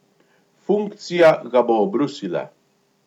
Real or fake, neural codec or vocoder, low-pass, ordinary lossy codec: real; none; 19.8 kHz; none